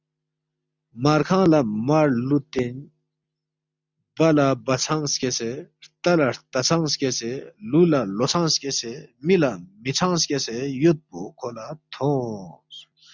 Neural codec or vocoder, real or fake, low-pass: none; real; 7.2 kHz